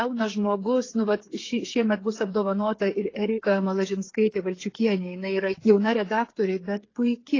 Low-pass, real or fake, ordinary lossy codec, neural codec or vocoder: 7.2 kHz; fake; AAC, 32 kbps; codec, 16 kHz, 8 kbps, FreqCodec, smaller model